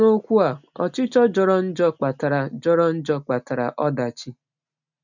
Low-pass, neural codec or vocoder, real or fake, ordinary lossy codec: 7.2 kHz; none; real; none